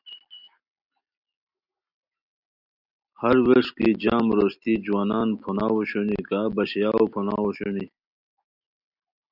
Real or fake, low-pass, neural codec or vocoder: real; 5.4 kHz; none